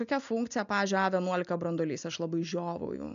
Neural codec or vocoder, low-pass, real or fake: none; 7.2 kHz; real